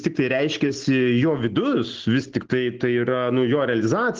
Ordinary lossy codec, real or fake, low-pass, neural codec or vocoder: Opus, 16 kbps; real; 7.2 kHz; none